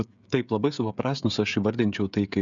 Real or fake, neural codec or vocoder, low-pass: fake; codec, 16 kHz, 16 kbps, FreqCodec, smaller model; 7.2 kHz